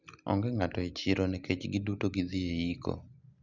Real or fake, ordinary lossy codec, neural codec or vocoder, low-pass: real; none; none; 7.2 kHz